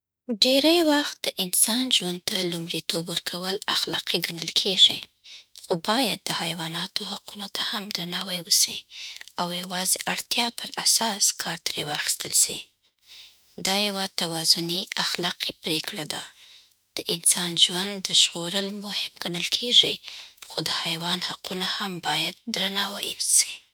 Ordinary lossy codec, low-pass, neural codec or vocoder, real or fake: none; none; autoencoder, 48 kHz, 32 numbers a frame, DAC-VAE, trained on Japanese speech; fake